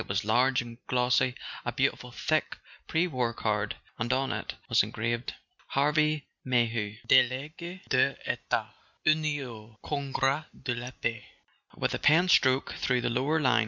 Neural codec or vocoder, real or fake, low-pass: none; real; 7.2 kHz